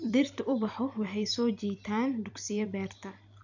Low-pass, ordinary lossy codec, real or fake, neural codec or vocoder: 7.2 kHz; none; fake; vocoder, 44.1 kHz, 128 mel bands every 256 samples, BigVGAN v2